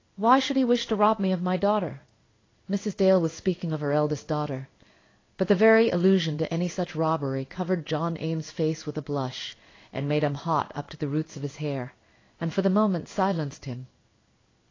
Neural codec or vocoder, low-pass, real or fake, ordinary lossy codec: codec, 16 kHz in and 24 kHz out, 1 kbps, XY-Tokenizer; 7.2 kHz; fake; AAC, 32 kbps